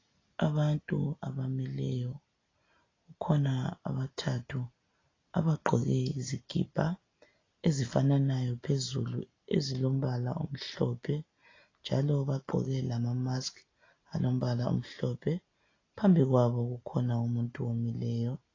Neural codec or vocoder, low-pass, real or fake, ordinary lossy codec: none; 7.2 kHz; real; AAC, 32 kbps